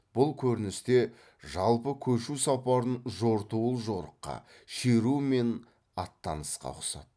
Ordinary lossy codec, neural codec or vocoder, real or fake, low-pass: none; none; real; none